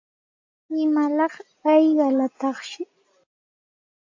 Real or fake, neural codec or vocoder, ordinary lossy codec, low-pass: real; none; AAC, 48 kbps; 7.2 kHz